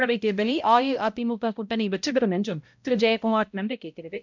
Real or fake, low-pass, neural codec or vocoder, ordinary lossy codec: fake; 7.2 kHz; codec, 16 kHz, 0.5 kbps, X-Codec, HuBERT features, trained on balanced general audio; MP3, 64 kbps